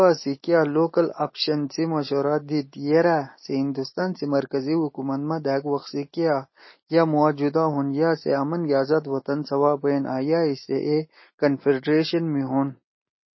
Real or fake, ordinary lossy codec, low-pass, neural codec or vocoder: real; MP3, 24 kbps; 7.2 kHz; none